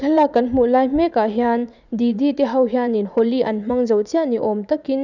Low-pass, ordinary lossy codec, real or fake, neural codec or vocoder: 7.2 kHz; none; fake; vocoder, 44.1 kHz, 80 mel bands, Vocos